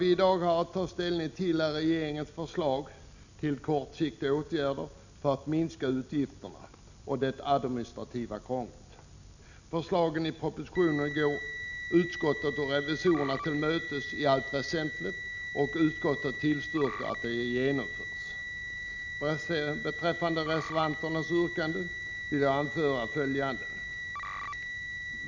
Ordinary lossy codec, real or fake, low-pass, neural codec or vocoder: none; real; 7.2 kHz; none